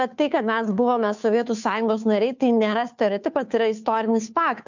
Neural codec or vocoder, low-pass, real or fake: codec, 16 kHz, 2 kbps, FunCodec, trained on Chinese and English, 25 frames a second; 7.2 kHz; fake